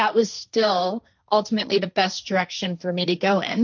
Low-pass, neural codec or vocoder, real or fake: 7.2 kHz; codec, 16 kHz, 1.1 kbps, Voila-Tokenizer; fake